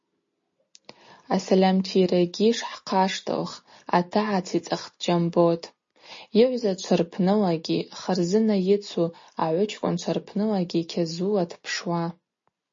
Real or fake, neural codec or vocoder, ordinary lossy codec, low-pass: real; none; MP3, 32 kbps; 7.2 kHz